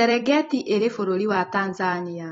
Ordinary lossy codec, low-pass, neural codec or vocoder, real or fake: AAC, 24 kbps; 19.8 kHz; vocoder, 44.1 kHz, 128 mel bands every 256 samples, BigVGAN v2; fake